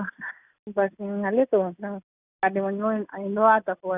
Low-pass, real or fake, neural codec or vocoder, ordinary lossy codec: 3.6 kHz; real; none; Opus, 64 kbps